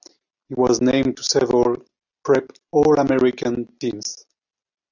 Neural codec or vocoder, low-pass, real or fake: none; 7.2 kHz; real